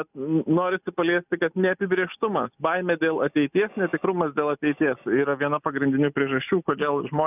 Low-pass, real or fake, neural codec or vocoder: 3.6 kHz; real; none